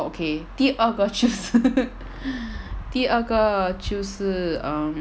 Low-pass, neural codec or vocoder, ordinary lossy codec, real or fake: none; none; none; real